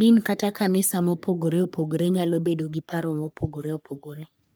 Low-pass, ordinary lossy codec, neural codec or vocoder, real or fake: none; none; codec, 44.1 kHz, 3.4 kbps, Pupu-Codec; fake